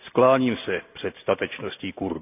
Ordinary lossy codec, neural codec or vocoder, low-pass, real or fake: none; none; 3.6 kHz; real